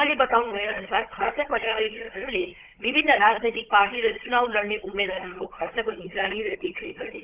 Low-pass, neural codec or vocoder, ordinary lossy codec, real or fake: 3.6 kHz; codec, 16 kHz, 16 kbps, FunCodec, trained on Chinese and English, 50 frames a second; Opus, 32 kbps; fake